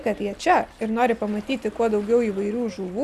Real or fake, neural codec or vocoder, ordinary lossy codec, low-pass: real; none; Opus, 64 kbps; 14.4 kHz